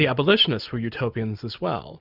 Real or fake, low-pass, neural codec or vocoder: real; 5.4 kHz; none